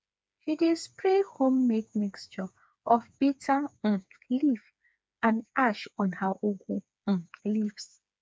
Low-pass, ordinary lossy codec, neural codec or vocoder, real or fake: none; none; codec, 16 kHz, 4 kbps, FreqCodec, smaller model; fake